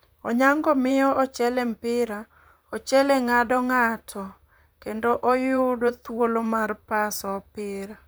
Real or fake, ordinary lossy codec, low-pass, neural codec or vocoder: fake; none; none; vocoder, 44.1 kHz, 128 mel bands, Pupu-Vocoder